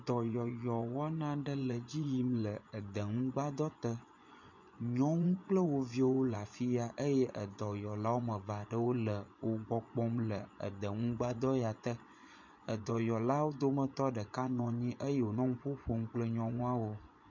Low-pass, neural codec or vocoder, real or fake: 7.2 kHz; vocoder, 24 kHz, 100 mel bands, Vocos; fake